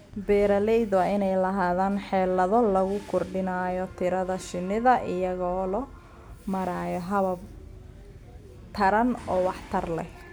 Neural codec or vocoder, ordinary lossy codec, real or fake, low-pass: none; none; real; none